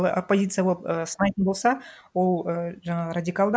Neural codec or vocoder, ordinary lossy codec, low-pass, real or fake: none; none; none; real